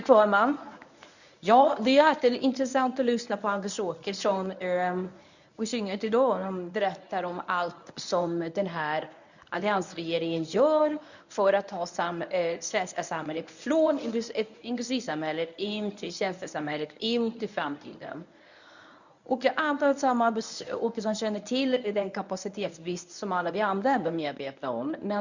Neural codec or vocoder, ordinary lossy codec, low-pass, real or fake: codec, 24 kHz, 0.9 kbps, WavTokenizer, medium speech release version 1; none; 7.2 kHz; fake